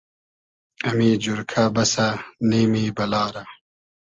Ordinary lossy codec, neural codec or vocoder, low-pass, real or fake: Opus, 24 kbps; none; 7.2 kHz; real